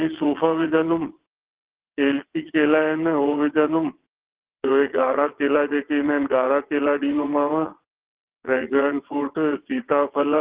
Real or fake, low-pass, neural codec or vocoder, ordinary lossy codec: fake; 3.6 kHz; vocoder, 22.05 kHz, 80 mel bands, WaveNeXt; Opus, 16 kbps